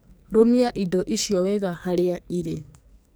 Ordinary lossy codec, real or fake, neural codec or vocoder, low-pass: none; fake; codec, 44.1 kHz, 2.6 kbps, SNAC; none